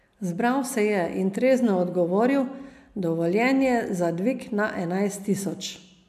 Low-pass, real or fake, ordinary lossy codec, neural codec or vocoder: 14.4 kHz; real; none; none